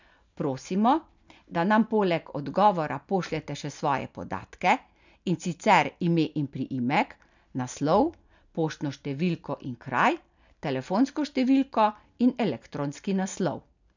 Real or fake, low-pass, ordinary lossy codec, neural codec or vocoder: real; 7.2 kHz; none; none